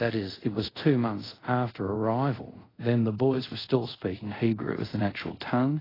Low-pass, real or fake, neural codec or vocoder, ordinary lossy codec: 5.4 kHz; fake; codec, 24 kHz, 0.5 kbps, DualCodec; AAC, 24 kbps